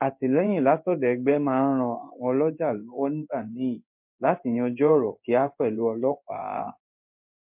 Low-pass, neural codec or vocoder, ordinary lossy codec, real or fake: 3.6 kHz; codec, 16 kHz in and 24 kHz out, 1 kbps, XY-Tokenizer; MP3, 32 kbps; fake